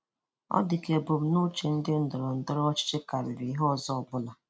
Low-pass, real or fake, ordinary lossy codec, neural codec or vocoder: none; real; none; none